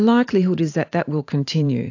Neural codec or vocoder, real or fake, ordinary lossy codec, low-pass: vocoder, 44.1 kHz, 128 mel bands every 512 samples, BigVGAN v2; fake; AAC, 48 kbps; 7.2 kHz